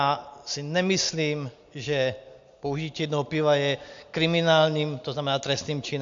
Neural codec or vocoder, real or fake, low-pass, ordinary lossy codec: none; real; 7.2 kHz; AAC, 64 kbps